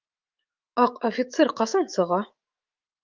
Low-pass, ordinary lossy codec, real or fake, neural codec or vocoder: 7.2 kHz; Opus, 24 kbps; fake; autoencoder, 48 kHz, 128 numbers a frame, DAC-VAE, trained on Japanese speech